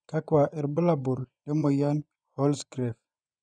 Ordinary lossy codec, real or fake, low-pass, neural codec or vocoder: none; fake; 9.9 kHz; vocoder, 24 kHz, 100 mel bands, Vocos